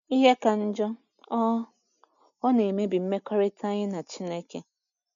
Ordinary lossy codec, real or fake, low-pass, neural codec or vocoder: none; real; 7.2 kHz; none